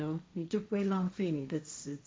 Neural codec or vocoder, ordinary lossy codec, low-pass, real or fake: codec, 16 kHz, 1.1 kbps, Voila-Tokenizer; none; none; fake